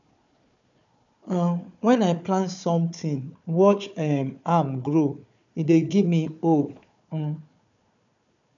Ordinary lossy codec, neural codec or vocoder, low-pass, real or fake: none; codec, 16 kHz, 4 kbps, FunCodec, trained on Chinese and English, 50 frames a second; 7.2 kHz; fake